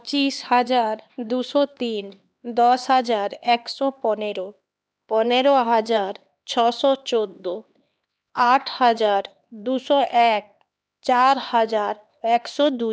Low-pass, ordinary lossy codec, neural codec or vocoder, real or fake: none; none; codec, 16 kHz, 2 kbps, X-Codec, HuBERT features, trained on LibriSpeech; fake